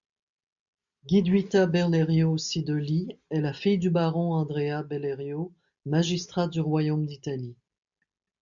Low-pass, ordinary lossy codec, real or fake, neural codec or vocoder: 7.2 kHz; MP3, 96 kbps; real; none